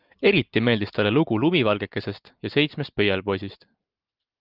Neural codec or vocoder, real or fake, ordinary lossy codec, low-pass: none; real; Opus, 24 kbps; 5.4 kHz